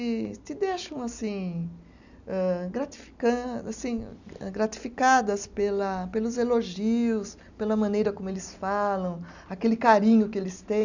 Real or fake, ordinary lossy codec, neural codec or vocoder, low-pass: real; none; none; 7.2 kHz